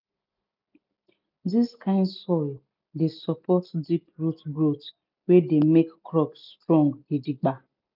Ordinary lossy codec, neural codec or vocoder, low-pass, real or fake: none; none; 5.4 kHz; real